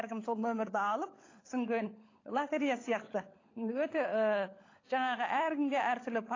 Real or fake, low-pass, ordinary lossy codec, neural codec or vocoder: fake; 7.2 kHz; AAC, 32 kbps; codec, 16 kHz, 16 kbps, FunCodec, trained on LibriTTS, 50 frames a second